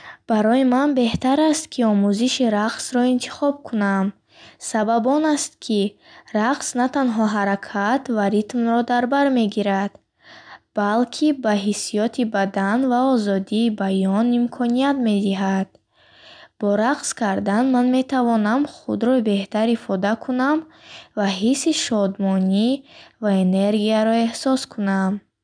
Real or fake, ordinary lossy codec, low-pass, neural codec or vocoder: real; none; 9.9 kHz; none